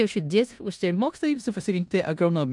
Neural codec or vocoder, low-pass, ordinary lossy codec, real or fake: codec, 16 kHz in and 24 kHz out, 0.9 kbps, LongCat-Audio-Codec, four codebook decoder; 10.8 kHz; MP3, 96 kbps; fake